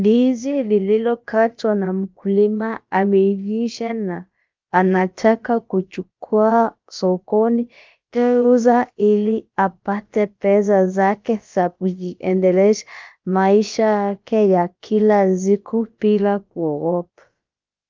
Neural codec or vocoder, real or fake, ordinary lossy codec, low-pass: codec, 16 kHz, about 1 kbps, DyCAST, with the encoder's durations; fake; Opus, 24 kbps; 7.2 kHz